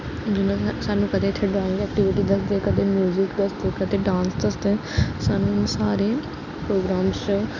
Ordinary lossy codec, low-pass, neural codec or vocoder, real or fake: none; 7.2 kHz; none; real